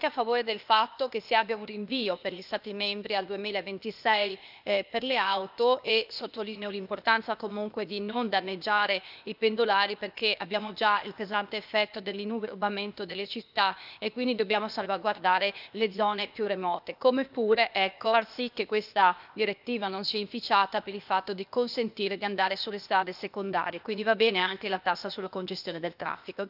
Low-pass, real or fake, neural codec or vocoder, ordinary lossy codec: 5.4 kHz; fake; codec, 16 kHz, 0.8 kbps, ZipCodec; none